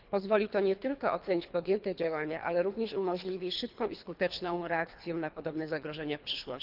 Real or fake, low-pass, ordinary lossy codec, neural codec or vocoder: fake; 5.4 kHz; Opus, 24 kbps; codec, 24 kHz, 3 kbps, HILCodec